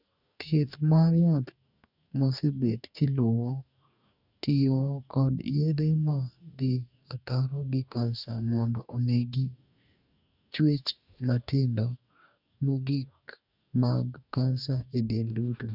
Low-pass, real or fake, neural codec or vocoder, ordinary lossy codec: 5.4 kHz; fake; codec, 44.1 kHz, 2.6 kbps, DAC; none